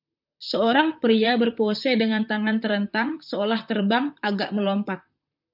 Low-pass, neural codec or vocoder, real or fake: 5.4 kHz; vocoder, 44.1 kHz, 128 mel bands, Pupu-Vocoder; fake